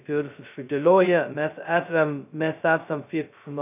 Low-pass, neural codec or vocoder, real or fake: 3.6 kHz; codec, 16 kHz, 0.2 kbps, FocalCodec; fake